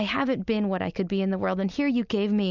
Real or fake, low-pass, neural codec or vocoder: real; 7.2 kHz; none